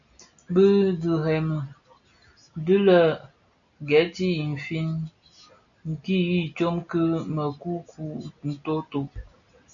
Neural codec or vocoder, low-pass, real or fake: none; 7.2 kHz; real